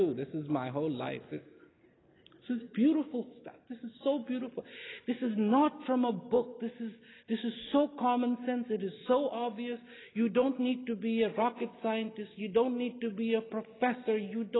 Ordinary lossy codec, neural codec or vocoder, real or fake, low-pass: AAC, 16 kbps; none; real; 7.2 kHz